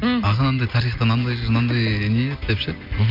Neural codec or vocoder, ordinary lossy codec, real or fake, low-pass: none; MP3, 48 kbps; real; 5.4 kHz